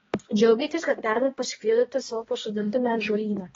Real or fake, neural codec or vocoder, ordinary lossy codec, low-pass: fake; codec, 16 kHz, 1 kbps, X-Codec, HuBERT features, trained on balanced general audio; AAC, 24 kbps; 7.2 kHz